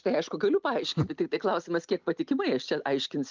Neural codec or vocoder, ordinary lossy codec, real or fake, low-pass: none; Opus, 24 kbps; real; 7.2 kHz